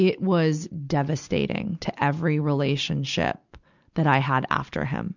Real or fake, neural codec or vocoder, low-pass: real; none; 7.2 kHz